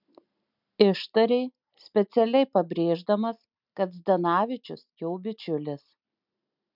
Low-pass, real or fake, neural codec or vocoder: 5.4 kHz; real; none